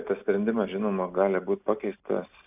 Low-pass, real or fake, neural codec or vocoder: 3.6 kHz; real; none